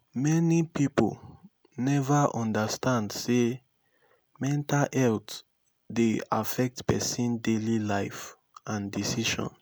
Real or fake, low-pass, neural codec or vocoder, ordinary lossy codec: real; none; none; none